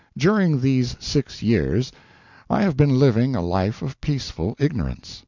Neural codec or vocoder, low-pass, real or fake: none; 7.2 kHz; real